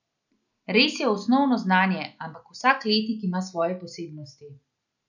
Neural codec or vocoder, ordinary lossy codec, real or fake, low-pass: none; none; real; 7.2 kHz